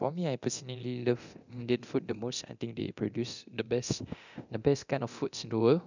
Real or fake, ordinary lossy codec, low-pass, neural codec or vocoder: fake; none; 7.2 kHz; codec, 24 kHz, 0.9 kbps, DualCodec